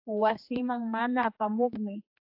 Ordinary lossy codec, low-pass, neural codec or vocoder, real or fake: AAC, 48 kbps; 5.4 kHz; codec, 16 kHz, 2 kbps, X-Codec, HuBERT features, trained on general audio; fake